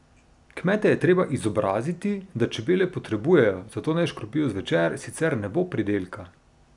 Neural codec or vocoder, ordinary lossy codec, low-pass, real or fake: none; none; 10.8 kHz; real